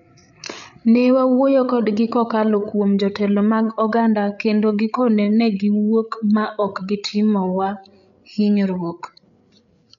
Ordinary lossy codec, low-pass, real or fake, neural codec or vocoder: none; 7.2 kHz; fake; codec, 16 kHz, 8 kbps, FreqCodec, larger model